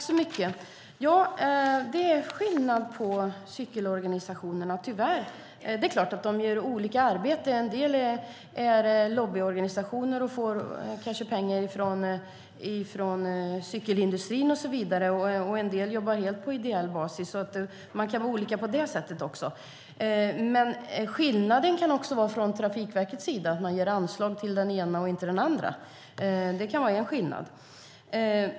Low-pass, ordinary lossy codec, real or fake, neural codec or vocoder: none; none; real; none